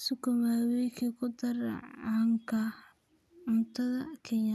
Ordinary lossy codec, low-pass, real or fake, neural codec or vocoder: none; 14.4 kHz; real; none